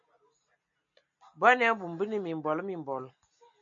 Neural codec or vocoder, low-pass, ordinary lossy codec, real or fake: none; 7.2 kHz; MP3, 64 kbps; real